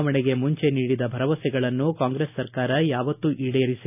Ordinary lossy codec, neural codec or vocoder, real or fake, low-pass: none; none; real; 3.6 kHz